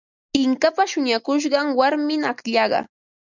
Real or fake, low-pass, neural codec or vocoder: real; 7.2 kHz; none